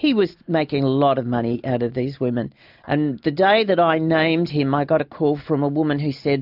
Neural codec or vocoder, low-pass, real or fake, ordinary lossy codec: none; 5.4 kHz; real; AAC, 48 kbps